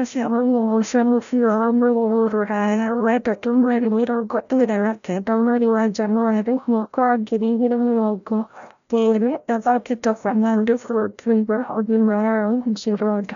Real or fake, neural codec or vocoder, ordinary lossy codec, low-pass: fake; codec, 16 kHz, 0.5 kbps, FreqCodec, larger model; none; 7.2 kHz